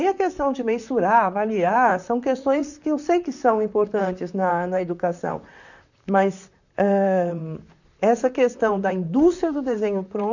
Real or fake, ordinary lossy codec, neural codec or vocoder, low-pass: fake; none; vocoder, 44.1 kHz, 128 mel bands, Pupu-Vocoder; 7.2 kHz